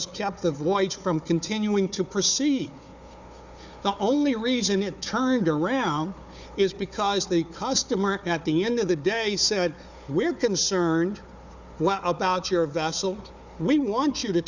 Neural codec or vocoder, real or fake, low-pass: codec, 16 kHz, 4 kbps, FunCodec, trained on Chinese and English, 50 frames a second; fake; 7.2 kHz